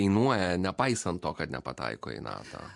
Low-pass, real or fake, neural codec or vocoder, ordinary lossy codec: 10.8 kHz; fake; vocoder, 44.1 kHz, 128 mel bands every 512 samples, BigVGAN v2; MP3, 64 kbps